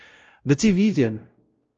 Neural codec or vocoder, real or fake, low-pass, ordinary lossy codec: codec, 16 kHz, 0.5 kbps, X-Codec, WavLM features, trained on Multilingual LibriSpeech; fake; 7.2 kHz; Opus, 24 kbps